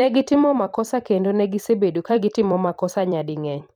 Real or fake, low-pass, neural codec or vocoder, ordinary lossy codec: fake; 19.8 kHz; vocoder, 44.1 kHz, 128 mel bands every 256 samples, BigVGAN v2; none